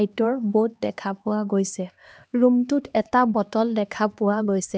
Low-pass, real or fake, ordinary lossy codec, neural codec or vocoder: none; fake; none; codec, 16 kHz, 2 kbps, X-Codec, HuBERT features, trained on LibriSpeech